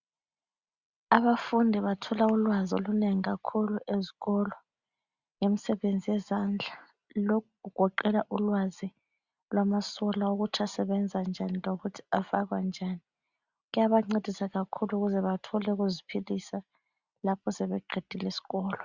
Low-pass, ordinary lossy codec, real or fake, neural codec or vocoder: 7.2 kHz; Opus, 64 kbps; real; none